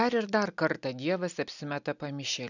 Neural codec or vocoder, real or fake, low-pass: none; real; 7.2 kHz